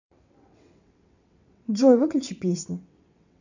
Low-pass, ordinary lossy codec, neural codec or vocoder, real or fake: 7.2 kHz; none; vocoder, 22.05 kHz, 80 mel bands, WaveNeXt; fake